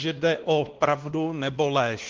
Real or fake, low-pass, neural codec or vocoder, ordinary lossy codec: fake; 7.2 kHz; codec, 16 kHz, 2 kbps, X-Codec, WavLM features, trained on Multilingual LibriSpeech; Opus, 16 kbps